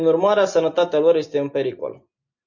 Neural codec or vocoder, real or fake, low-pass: none; real; 7.2 kHz